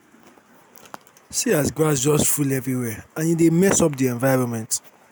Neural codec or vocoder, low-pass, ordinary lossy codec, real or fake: none; none; none; real